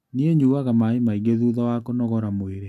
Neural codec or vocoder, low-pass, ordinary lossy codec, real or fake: none; 14.4 kHz; none; real